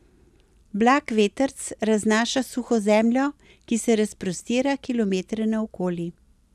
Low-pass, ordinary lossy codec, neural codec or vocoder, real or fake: none; none; none; real